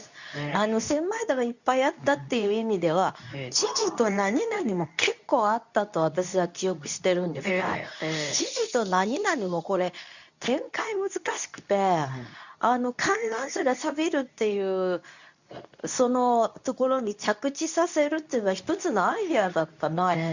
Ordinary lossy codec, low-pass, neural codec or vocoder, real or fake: none; 7.2 kHz; codec, 24 kHz, 0.9 kbps, WavTokenizer, medium speech release version 2; fake